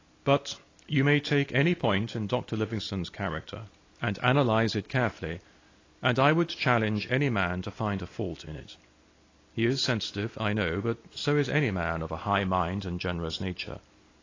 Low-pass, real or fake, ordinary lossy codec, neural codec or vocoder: 7.2 kHz; real; AAC, 32 kbps; none